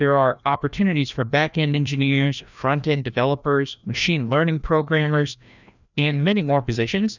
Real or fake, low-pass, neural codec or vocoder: fake; 7.2 kHz; codec, 16 kHz, 1 kbps, FreqCodec, larger model